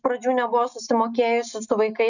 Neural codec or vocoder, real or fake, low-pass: none; real; 7.2 kHz